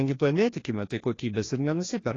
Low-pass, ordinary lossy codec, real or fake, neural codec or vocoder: 7.2 kHz; AAC, 32 kbps; fake; codec, 16 kHz, 1 kbps, FreqCodec, larger model